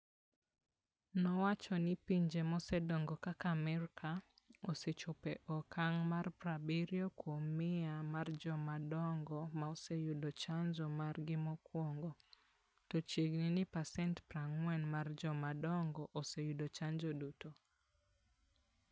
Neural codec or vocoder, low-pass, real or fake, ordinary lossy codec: none; none; real; none